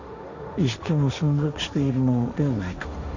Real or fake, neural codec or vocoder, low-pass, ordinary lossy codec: fake; codec, 16 kHz, 1.1 kbps, Voila-Tokenizer; 7.2 kHz; none